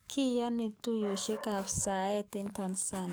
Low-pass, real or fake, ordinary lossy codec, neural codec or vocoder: none; fake; none; codec, 44.1 kHz, 7.8 kbps, DAC